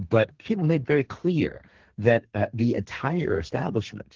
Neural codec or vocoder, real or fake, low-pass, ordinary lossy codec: codec, 32 kHz, 1.9 kbps, SNAC; fake; 7.2 kHz; Opus, 16 kbps